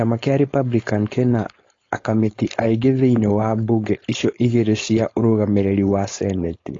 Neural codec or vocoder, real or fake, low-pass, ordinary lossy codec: codec, 16 kHz, 4.8 kbps, FACodec; fake; 7.2 kHz; AAC, 32 kbps